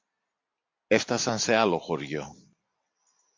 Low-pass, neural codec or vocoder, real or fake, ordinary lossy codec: 7.2 kHz; none; real; MP3, 48 kbps